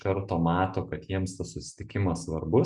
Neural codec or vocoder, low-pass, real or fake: none; 10.8 kHz; real